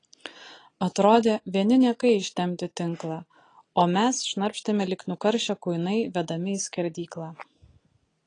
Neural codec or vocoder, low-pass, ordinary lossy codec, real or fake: none; 9.9 kHz; AAC, 32 kbps; real